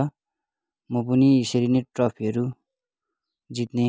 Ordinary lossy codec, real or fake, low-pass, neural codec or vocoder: none; real; none; none